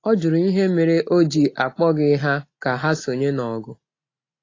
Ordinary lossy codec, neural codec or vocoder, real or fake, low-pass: AAC, 32 kbps; none; real; 7.2 kHz